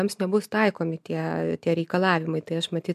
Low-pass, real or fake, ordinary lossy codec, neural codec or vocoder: 14.4 kHz; real; MP3, 96 kbps; none